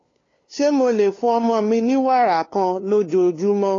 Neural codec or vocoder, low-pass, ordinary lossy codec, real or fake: codec, 16 kHz, 2 kbps, FunCodec, trained on LibriTTS, 25 frames a second; 7.2 kHz; AAC, 32 kbps; fake